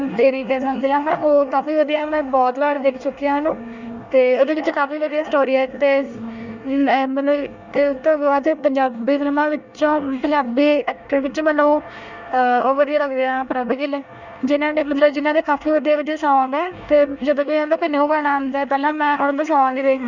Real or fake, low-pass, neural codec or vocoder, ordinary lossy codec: fake; 7.2 kHz; codec, 24 kHz, 1 kbps, SNAC; none